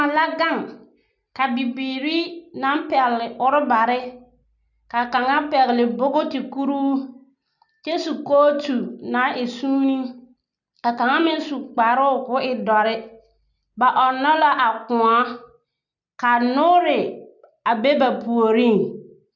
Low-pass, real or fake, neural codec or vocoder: 7.2 kHz; real; none